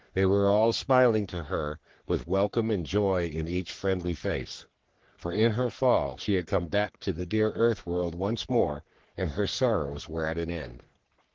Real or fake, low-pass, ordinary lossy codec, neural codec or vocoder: fake; 7.2 kHz; Opus, 16 kbps; codec, 44.1 kHz, 3.4 kbps, Pupu-Codec